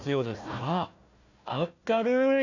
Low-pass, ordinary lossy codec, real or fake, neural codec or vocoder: 7.2 kHz; none; fake; codec, 16 kHz, 1 kbps, FunCodec, trained on Chinese and English, 50 frames a second